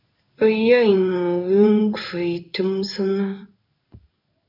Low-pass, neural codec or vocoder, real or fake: 5.4 kHz; codec, 16 kHz in and 24 kHz out, 1 kbps, XY-Tokenizer; fake